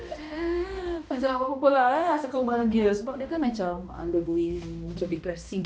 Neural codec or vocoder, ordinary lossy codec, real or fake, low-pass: codec, 16 kHz, 1 kbps, X-Codec, HuBERT features, trained on balanced general audio; none; fake; none